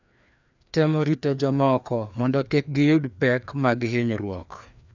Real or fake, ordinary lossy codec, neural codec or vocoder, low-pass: fake; none; codec, 16 kHz, 2 kbps, FreqCodec, larger model; 7.2 kHz